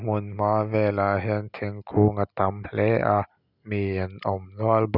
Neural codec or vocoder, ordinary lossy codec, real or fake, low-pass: none; none; real; 5.4 kHz